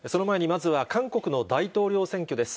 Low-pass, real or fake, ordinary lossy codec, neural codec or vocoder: none; real; none; none